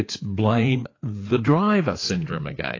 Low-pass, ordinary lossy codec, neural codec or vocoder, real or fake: 7.2 kHz; AAC, 32 kbps; codec, 16 kHz, 4 kbps, FreqCodec, larger model; fake